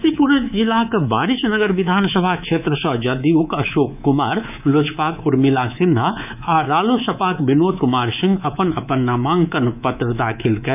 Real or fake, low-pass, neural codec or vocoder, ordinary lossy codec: fake; 3.6 kHz; codec, 24 kHz, 3.1 kbps, DualCodec; none